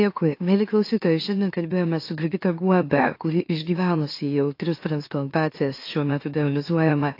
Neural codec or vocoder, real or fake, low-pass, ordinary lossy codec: autoencoder, 44.1 kHz, a latent of 192 numbers a frame, MeloTTS; fake; 5.4 kHz; AAC, 32 kbps